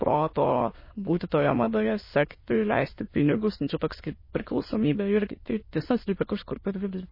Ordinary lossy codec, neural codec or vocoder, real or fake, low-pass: MP3, 24 kbps; autoencoder, 22.05 kHz, a latent of 192 numbers a frame, VITS, trained on many speakers; fake; 5.4 kHz